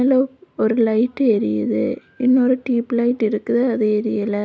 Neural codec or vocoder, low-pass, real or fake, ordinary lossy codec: none; none; real; none